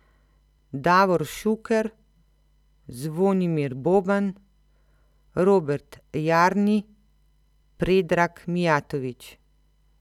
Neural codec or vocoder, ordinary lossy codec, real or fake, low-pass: none; none; real; 19.8 kHz